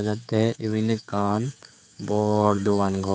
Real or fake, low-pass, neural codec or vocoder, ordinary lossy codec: fake; none; codec, 16 kHz, 4 kbps, X-Codec, HuBERT features, trained on general audio; none